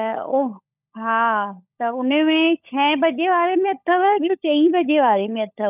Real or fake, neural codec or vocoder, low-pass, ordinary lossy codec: fake; codec, 16 kHz, 16 kbps, FunCodec, trained on LibriTTS, 50 frames a second; 3.6 kHz; none